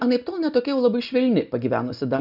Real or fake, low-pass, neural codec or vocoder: real; 5.4 kHz; none